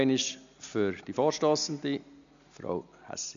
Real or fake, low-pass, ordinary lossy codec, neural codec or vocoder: real; 7.2 kHz; none; none